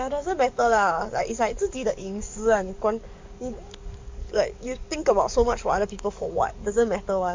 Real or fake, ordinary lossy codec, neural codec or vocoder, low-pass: fake; MP3, 64 kbps; codec, 44.1 kHz, 7.8 kbps, DAC; 7.2 kHz